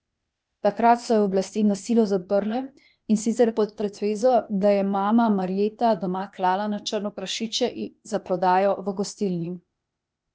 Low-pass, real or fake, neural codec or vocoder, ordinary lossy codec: none; fake; codec, 16 kHz, 0.8 kbps, ZipCodec; none